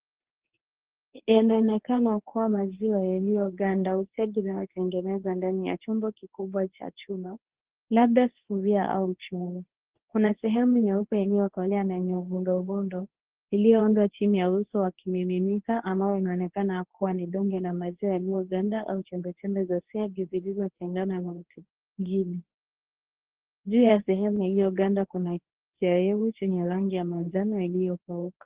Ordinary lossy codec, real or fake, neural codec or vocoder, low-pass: Opus, 16 kbps; fake; codec, 24 kHz, 0.9 kbps, WavTokenizer, medium speech release version 2; 3.6 kHz